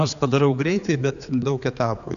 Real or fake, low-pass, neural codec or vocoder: fake; 7.2 kHz; codec, 16 kHz, 4 kbps, X-Codec, HuBERT features, trained on general audio